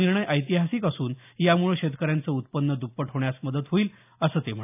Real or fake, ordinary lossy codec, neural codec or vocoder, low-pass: real; AAC, 32 kbps; none; 3.6 kHz